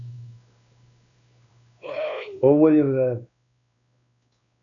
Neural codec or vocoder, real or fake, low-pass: codec, 16 kHz, 2 kbps, X-Codec, WavLM features, trained on Multilingual LibriSpeech; fake; 7.2 kHz